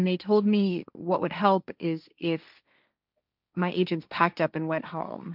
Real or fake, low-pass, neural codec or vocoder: fake; 5.4 kHz; codec, 16 kHz, 1.1 kbps, Voila-Tokenizer